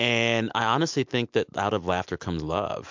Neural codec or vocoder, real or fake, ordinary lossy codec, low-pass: none; real; MP3, 64 kbps; 7.2 kHz